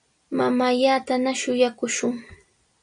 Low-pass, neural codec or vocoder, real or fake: 9.9 kHz; none; real